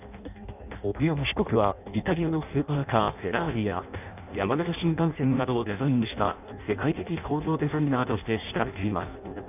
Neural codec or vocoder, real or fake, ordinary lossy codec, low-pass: codec, 16 kHz in and 24 kHz out, 0.6 kbps, FireRedTTS-2 codec; fake; none; 3.6 kHz